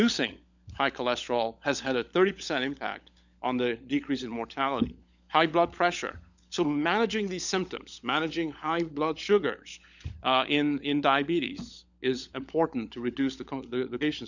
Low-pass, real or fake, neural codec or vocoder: 7.2 kHz; fake; codec, 16 kHz, 16 kbps, FunCodec, trained on LibriTTS, 50 frames a second